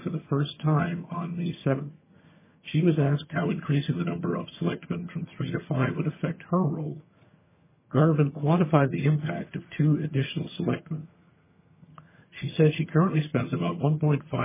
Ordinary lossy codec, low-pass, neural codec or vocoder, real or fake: MP3, 16 kbps; 3.6 kHz; vocoder, 22.05 kHz, 80 mel bands, HiFi-GAN; fake